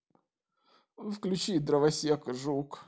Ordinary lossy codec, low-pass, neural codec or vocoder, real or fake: none; none; none; real